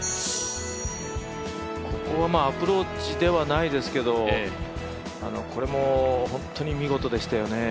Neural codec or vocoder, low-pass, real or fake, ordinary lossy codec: none; none; real; none